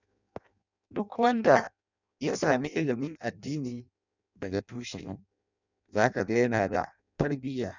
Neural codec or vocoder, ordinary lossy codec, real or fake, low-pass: codec, 16 kHz in and 24 kHz out, 0.6 kbps, FireRedTTS-2 codec; none; fake; 7.2 kHz